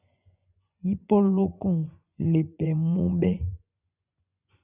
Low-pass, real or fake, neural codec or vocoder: 3.6 kHz; fake; vocoder, 24 kHz, 100 mel bands, Vocos